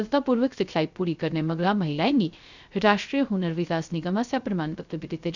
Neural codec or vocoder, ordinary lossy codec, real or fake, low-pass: codec, 16 kHz, 0.3 kbps, FocalCodec; Opus, 64 kbps; fake; 7.2 kHz